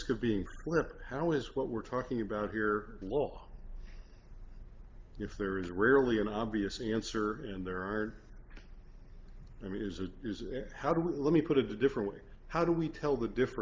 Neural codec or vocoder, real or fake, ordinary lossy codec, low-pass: none; real; Opus, 32 kbps; 7.2 kHz